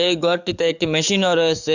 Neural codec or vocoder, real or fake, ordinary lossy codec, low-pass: codec, 44.1 kHz, 7.8 kbps, DAC; fake; none; 7.2 kHz